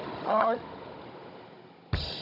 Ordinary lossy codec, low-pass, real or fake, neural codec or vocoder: none; 5.4 kHz; fake; codec, 16 kHz, 16 kbps, FunCodec, trained on Chinese and English, 50 frames a second